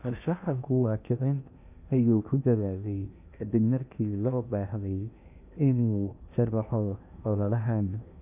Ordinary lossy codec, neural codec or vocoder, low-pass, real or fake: none; codec, 16 kHz in and 24 kHz out, 0.8 kbps, FocalCodec, streaming, 65536 codes; 3.6 kHz; fake